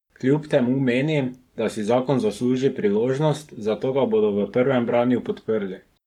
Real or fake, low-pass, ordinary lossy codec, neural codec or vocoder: fake; 19.8 kHz; none; codec, 44.1 kHz, 7.8 kbps, Pupu-Codec